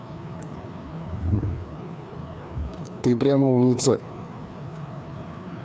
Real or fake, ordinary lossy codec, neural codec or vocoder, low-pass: fake; none; codec, 16 kHz, 2 kbps, FreqCodec, larger model; none